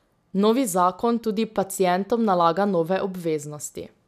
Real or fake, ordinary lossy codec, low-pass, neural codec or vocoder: real; none; 14.4 kHz; none